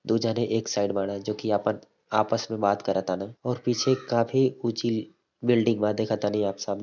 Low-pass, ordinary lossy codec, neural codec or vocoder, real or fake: 7.2 kHz; none; none; real